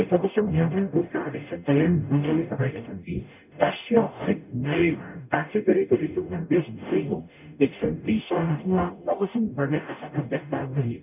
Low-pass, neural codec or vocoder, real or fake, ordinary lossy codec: 3.6 kHz; codec, 44.1 kHz, 0.9 kbps, DAC; fake; none